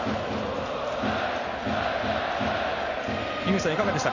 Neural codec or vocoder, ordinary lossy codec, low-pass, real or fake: none; none; 7.2 kHz; real